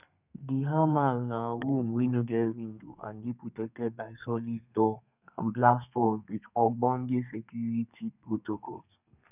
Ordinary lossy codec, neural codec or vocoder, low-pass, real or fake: none; codec, 32 kHz, 1.9 kbps, SNAC; 3.6 kHz; fake